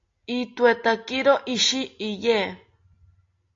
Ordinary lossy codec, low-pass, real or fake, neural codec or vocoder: AAC, 48 kbps; 7.2 kHz; real; none